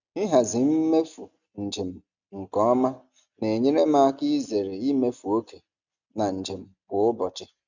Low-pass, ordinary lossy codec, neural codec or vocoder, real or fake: 7.2 kHz; none; none; real